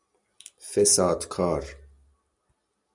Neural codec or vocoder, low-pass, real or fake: none; 10.8 kHz; real